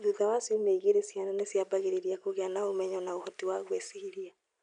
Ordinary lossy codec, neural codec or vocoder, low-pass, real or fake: none; none; 9.9 kHz; real